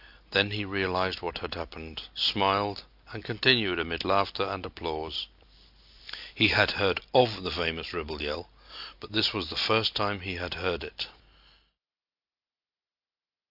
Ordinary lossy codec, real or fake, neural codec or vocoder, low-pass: AAC, 48 kbps; real; none; 5.4 kHz